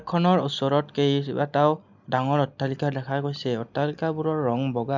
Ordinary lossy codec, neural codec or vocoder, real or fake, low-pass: none; none; real; 7.2 kHz